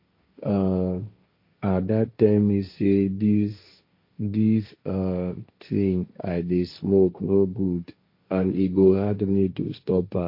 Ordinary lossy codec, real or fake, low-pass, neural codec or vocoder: MP3, 32 kbps; fake; 5.4 kHz; codec, 16 kHz, 1.1 kbps, Voila-Tokenizer